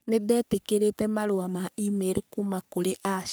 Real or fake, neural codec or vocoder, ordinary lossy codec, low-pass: fake; codec, 44.1 kHz, 3.4 kbps, Pupu-Codec; none; none